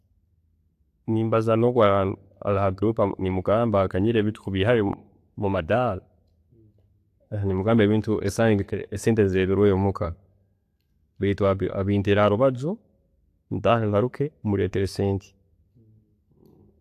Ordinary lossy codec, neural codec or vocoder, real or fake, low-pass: AAC, 64 kbps; none; real; 14.4 kHz